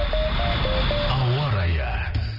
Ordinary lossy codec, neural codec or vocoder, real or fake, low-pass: none; none; real; 5.4 kHz